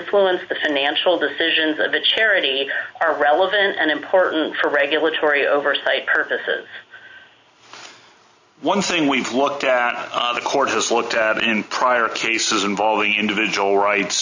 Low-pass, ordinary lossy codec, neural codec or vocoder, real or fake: 7.2 kHz; AAC, 48 kbps; none; real